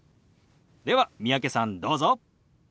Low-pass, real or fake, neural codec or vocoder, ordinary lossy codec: none; real; none; none